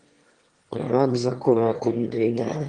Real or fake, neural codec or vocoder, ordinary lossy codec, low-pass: fake; autoencoder, 22.05 kHz, a latent of 192 numbers a frame, VITS, trained on one speaker; Opus, 24 kbps; 9.9 kHz